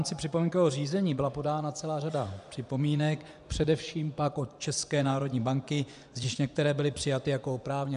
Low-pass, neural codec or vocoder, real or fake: 10.8 kHz; vocoder, 24 kHz, 100 mel bands, Vocos; fake